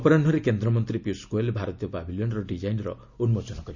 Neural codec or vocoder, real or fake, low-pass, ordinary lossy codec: none; real; 7.2 kHz; none